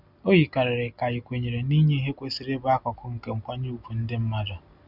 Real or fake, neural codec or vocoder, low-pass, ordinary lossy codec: real; none; 5.4 kHz; none